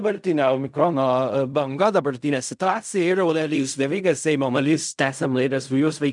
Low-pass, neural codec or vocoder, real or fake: 10.8 kHz; codec, 16 kHz in and 24 kHz out, 0.4 kbps, LongCat-Audio-Codec, fine tuned four codebook decoder; fake